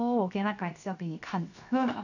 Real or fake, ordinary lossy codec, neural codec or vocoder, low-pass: fake; none; codec, 16 kHz, 0.7 kbps, FocalCodec; 7.2 kHz